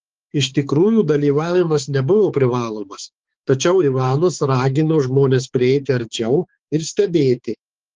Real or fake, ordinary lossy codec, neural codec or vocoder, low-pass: fake; Opus, 16 kbps; codec, 16 kHz, 4 kbps, X-Codec, HuBERT features, trained on balanced general audio; 7.2 kHz